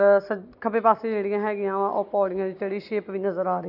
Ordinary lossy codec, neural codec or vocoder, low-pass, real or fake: none; none; 5.4 kHz; real